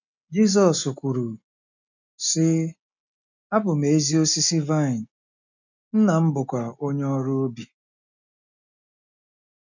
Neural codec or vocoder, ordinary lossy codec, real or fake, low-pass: none; none; real; 7.2 kHz